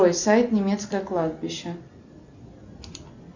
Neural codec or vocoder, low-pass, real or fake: none; 7.2 kHz; real